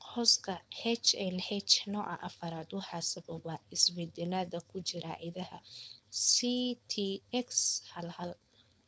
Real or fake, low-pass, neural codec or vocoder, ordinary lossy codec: fake; none; codec, 16 kHz, 4.8 kbps, FACodec; none